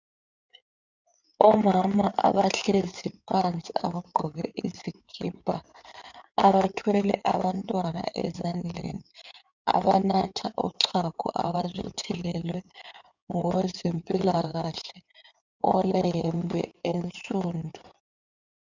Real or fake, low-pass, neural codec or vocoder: fake; 7.2 kHz; codec, 16 kHz in and 24 kHz out, 2.2 kbps, FireRedTTS-2 codec